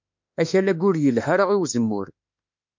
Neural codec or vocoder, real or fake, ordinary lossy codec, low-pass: autoencoder, 48 kHz, 32 numbers a frame, DAC-VAE, trained on Japanese speech; fake; MP3, 64 kbps; 7.2 kHz